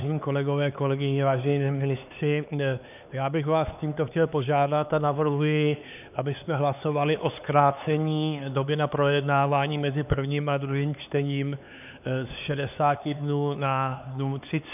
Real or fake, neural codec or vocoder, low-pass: fake; codec, 16 kHz, 4 kbps, X-Codec, HuBERT features, trained on LibriSpeech; 3.6 kHz